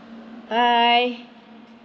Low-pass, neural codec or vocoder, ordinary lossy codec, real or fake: none; none; none; real